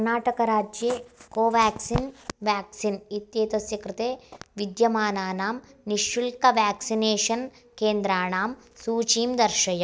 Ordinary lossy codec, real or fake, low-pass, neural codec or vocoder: none; real; none; none